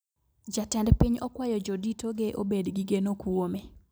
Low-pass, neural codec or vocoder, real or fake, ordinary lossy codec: none; none; real; none